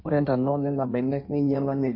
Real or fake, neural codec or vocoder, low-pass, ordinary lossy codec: fake; codec, 16 kHz in and 24 kHz out, 1.1 kbps, FireRedTTS-2 codec; 5.4 kHz; MP3, 32 kbps